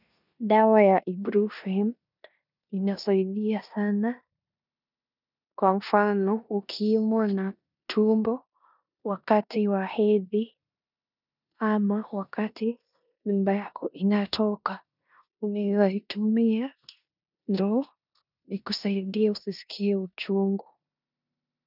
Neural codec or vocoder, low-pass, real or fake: codec, 16 kHz in and 24 kHz out, 0.9 kbps, LongCat-Audio-Codec, four codebook decoder; 5.4 kHz; fake